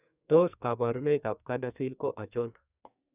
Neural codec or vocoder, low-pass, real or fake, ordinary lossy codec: codec, 44.1 kHz, 2.6 kbps, SNAC; 3.6 kHz; fake; none